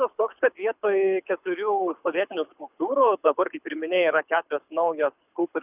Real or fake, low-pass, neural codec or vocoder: fake; 3.6 kHz; codec, 24 kHz, 6 kbps, HILCodec